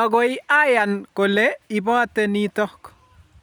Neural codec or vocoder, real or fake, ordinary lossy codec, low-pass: none; real; none; 19.8 kHz